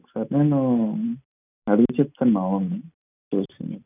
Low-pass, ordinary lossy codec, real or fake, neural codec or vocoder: 3.6 kHz; none; real; none